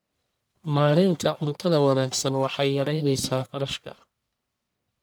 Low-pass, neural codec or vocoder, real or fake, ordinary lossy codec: none; codec, 44.1 kHz, 1.7 kbps, Pupu-Codec; fake; none